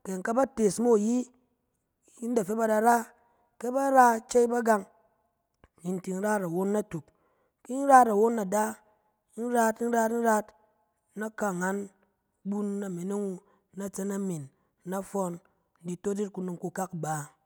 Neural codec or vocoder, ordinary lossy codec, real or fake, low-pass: none; none; real; none